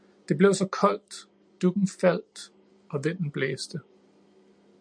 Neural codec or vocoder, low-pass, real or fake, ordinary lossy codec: none; 9.9 kHz; real; MP3, 96 kbps